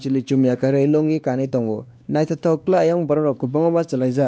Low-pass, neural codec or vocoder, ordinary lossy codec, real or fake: none; codec, 16 kHz, 2 kbps, X-Codec, WavLM features, trained on Multilingual LibriSpeech; none; fake